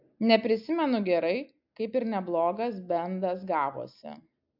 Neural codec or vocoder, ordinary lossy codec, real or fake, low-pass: none; AAC, 48 kbps; real; 5.4 kHz